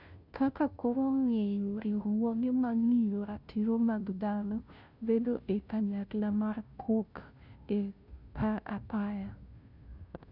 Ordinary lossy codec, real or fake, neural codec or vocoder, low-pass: none; fake; codec, 16 kHz, 0.5 kbps, FunCodec, trained on Chinese and English, 25 frames a second; 5.4 kHz